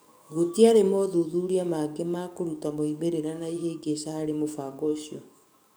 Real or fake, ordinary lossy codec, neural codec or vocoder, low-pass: fake; none; codec, 44.1 kHz, 7.8 kbps, DAC; none